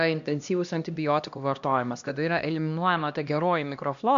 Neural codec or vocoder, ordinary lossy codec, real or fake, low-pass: codec, 16 kHz, 1 kbps, X-Codec, HuBERT features, trained on LibriSpeech; AAC, 64 kbps; fake; 7.2 kHz